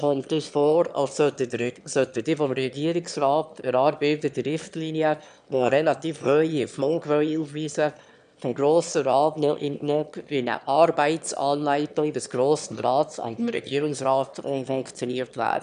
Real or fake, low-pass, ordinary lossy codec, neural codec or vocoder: fake; 9.9 kHz; none; autoencoder, 22.05 kHz, a latent of 192 numbers a frame, VITS, trained on one speaker